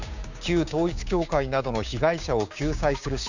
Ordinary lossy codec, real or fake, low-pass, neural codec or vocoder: none; fake; 7.2 kHz; codec, 16 kHz, 6 kbps, DAC